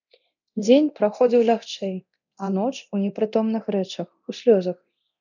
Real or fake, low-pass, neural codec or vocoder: fake; 7.2 kHz; codec, 24 kHz, 0.9 kbps, DualCodec